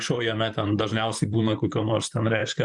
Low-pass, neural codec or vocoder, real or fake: 10.8 kHz; vocoder, 44.1 kHz, 128 mel bands, Pupu-Vocoder; fake